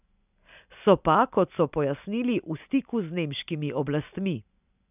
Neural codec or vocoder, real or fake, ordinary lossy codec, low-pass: none; real; none; 3.6 kHz